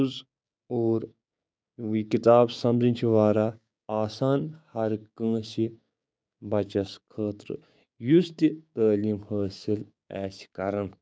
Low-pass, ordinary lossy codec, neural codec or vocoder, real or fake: none; none; codec, 16 kHz, 6 kbps, DAC; fake